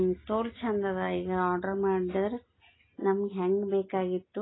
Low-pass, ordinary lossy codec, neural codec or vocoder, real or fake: 7.2 kHz; AAC, 16 kbps; none; real